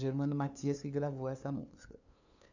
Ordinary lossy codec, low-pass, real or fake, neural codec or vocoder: none; 7.2 kHz; fake; codec, 16 kHz, 2 kbps, FunCodec, trained on LibriTTS, 25 frames a second